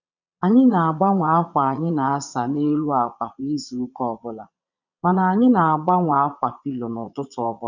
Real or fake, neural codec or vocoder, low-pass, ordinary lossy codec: fake; vocoder, 22.05 kHz, 80 mel bands, Vocos; 7.2 kHz; none